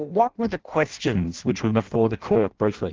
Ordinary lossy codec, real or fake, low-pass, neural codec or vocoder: Opus, 16 kbps; fake; 7.2 kHz; codec, 16 kHz in and 24 kHz out, 0.6 kbps, FireRedTTS-2 codec